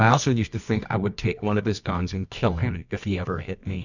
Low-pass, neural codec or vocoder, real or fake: 7.2 kHz; codec, 24 kHz, 0.9 kbps, WavTokenizer, medium music audio release; fake